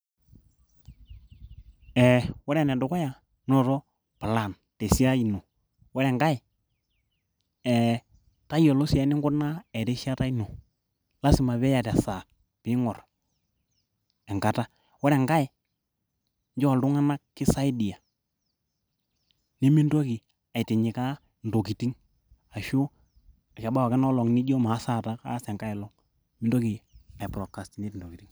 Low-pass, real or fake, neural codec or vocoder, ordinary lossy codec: none; real; none; none